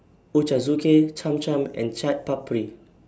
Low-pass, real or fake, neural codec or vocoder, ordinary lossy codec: none; real; none; none